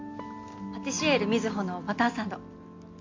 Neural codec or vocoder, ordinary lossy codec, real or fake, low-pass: none; MP3, 48 kbps; real; 7.2 kHz